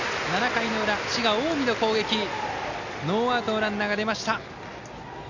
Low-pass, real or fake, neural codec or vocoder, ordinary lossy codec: 7.2 kHz; real; none; none